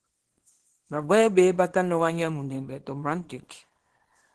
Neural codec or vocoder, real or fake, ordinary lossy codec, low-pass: codec, 24 kHz, 0.9 kbps, WavTokenizer, small release; fake; Opus, 16 kbps; 10.8 kHz